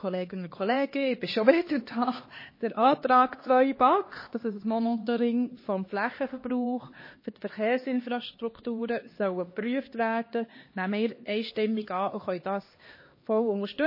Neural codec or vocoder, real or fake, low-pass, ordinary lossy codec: codec, 16 kHz, 2 kbps, X-Codec, HuBERT features, trained on LibriSpeech; fake; 5.4 kHz; MP3, 24 kbps